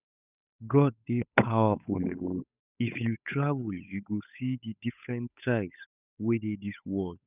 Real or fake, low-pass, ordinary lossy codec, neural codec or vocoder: fake; 3.6 kHz; none; codec, 16 kHz, 8 kbps, FunCodec, trained on Chinese and English, 25 frames a second